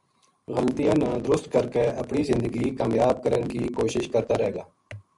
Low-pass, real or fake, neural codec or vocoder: 10.8 kHz; fake; vocoder, 44.1 kHz, 128 mel bands every 512 samples, BigVGAN v2